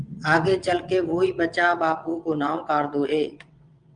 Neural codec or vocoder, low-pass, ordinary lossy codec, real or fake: vocoder, 22.05 kHz, 80 mel bands, WaveNeXt; 9.9 kHz; Opus, 32 kbps; fake